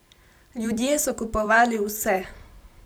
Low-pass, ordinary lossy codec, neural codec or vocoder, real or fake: none; none; vocoder, 44.1 kHz, 128 mel bands every 512 samples, BigVGAN v2; fake